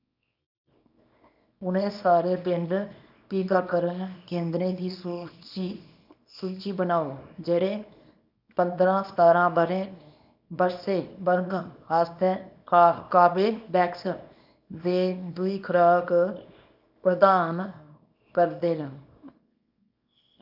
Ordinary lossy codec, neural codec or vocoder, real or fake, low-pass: none; codec, 24 kHz, 0.9 kbps, WavTokenizer, small release; fake; 5.4 kHz